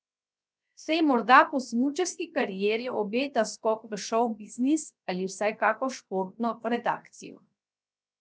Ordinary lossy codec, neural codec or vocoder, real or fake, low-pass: none; codec, 16 kHz, 0.7 kbps, FocalCodec; fake; none